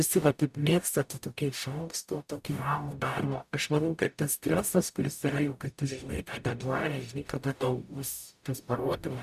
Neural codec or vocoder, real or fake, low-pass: codec, 44.1 kHz, 0.9 kbps, DAC; fake; 14.4 kHz